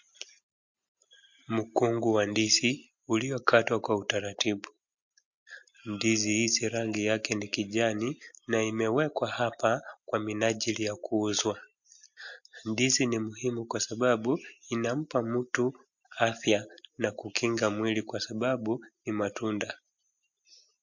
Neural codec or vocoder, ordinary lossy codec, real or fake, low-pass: none; MP3, 48 kbps; real; 7.2 kHz